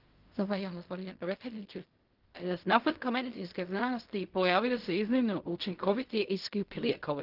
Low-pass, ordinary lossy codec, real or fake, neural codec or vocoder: 5.4 kHz; Opus, 32 kbps; fake; codec, 16 kHz in and 24 kHz out, 0.4 kbps, LongCat-Audio-Codec, fine tuned four codebook decoder